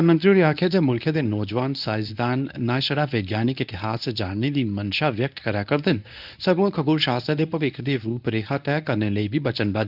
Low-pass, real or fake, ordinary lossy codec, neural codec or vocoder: 5.4 kHz; fake; none; codec, 24 kHz, 0.9 kbps, WavTokenizer, medium speech release version 1